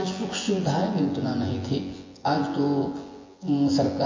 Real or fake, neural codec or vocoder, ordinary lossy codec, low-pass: fake; vocoder, 24 kHz, 100 mel bands, Vocos; MP3, 32 kbps; 7.2 kHz